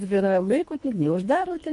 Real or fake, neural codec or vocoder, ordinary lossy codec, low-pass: fake; codec, 24 kHz, 1.5 kbps, HILCodec; MP3, 48 kbps; 10.8 kHz